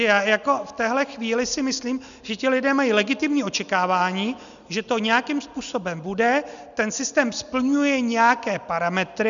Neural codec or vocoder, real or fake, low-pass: none; real; 7.2 kHz